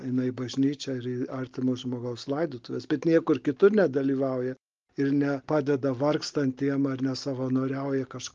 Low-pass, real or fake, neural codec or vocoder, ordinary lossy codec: 7.2 kHz; real; none; Opus, 32 kbps